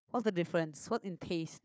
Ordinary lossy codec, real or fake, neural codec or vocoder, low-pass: none; fake; codec, 16 kHz, 4 kbps, FunCodec, trained on Chinese and English, 50 frames a second; none